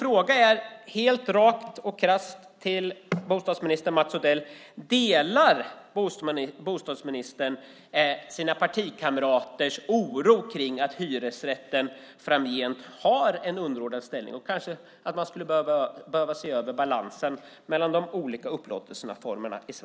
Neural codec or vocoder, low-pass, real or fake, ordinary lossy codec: none; none; real; none